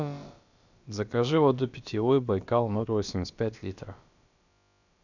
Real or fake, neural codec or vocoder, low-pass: fake; codec, 16 kHz, about 1 kbps, DyCAST, with the encoder's durations; 7.2 kHz